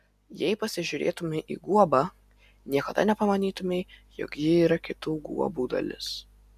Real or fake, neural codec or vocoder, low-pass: real; none; 14.4 kHz